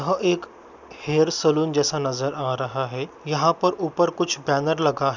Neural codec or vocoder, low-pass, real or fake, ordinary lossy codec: none; 7.2 kHz; real; none